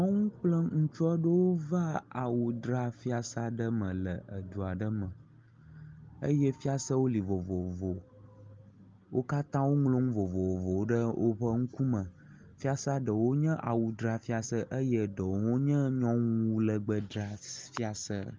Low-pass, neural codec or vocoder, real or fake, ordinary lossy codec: 7.2 kHz; none; real; Opus, 32 kbps